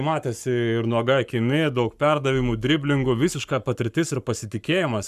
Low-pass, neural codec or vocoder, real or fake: 14.4 kHz; codec, 44.1 kHz, 7.8 kbps, Pupu-Codec; fake